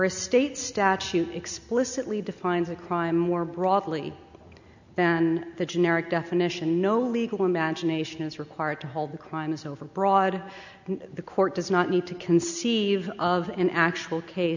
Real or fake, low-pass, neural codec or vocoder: real; 7.2 kHz; none